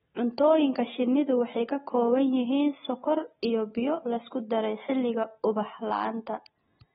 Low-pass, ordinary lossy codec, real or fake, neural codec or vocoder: 19.8 kHz; AAC, 16 kbps; real; none